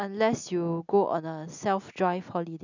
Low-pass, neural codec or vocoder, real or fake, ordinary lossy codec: 7.2 kHz; none; real; none